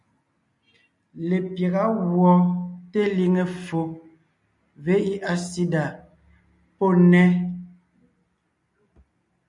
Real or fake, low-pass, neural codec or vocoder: real; 10.8 kHz; none